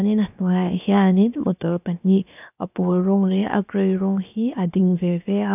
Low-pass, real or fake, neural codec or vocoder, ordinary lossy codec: 3.6 kHz; fake; codec, 16 kHz, 0.7 kbps, FocalCodec; none